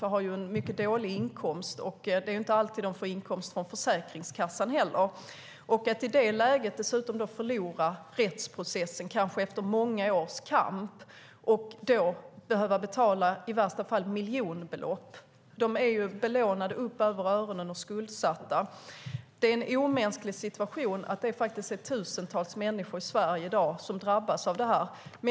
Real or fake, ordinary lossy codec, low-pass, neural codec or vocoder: real; none; none; none